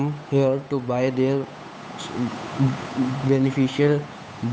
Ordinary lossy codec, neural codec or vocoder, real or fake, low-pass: none; codec, 16 kHz, 8 kbps, FunCodec, trained on Chinese and English, 25 frames a second; fake; none